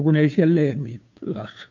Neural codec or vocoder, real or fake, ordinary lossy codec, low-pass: codec, 16 kHz, 2 kbps, FunCodec, trained on Chinese and English, 25 frames a second; fake; none; 7.2 kHz